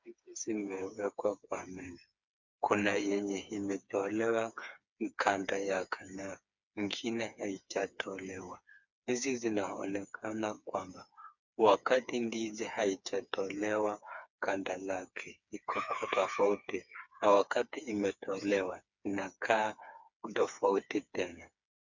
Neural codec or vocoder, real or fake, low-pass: codec, 16 kHz, 4 kbps, FreqCodec, smaller model; fake; 7.2 kHz